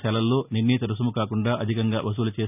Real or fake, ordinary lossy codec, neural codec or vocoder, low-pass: real; none; none; 3.6 kHz